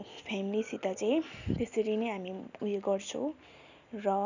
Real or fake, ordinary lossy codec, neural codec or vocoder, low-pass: real; none; none; 7.2 kHz